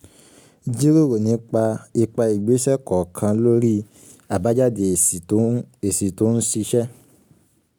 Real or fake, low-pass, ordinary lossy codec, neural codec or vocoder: real; none; none; none